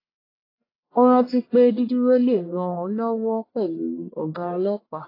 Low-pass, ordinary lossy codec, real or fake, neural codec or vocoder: 5.4 kHz; AAC, 24 kbps; fake; codec, 44.1 kHz, 1.7 kbps, Pupu-Codec